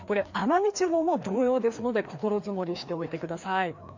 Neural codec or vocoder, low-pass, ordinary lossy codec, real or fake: codec, 16 kHz, 2 kbps, FreqCodec, larger model; 7.2 kHz; MP3, 48 kbps; fake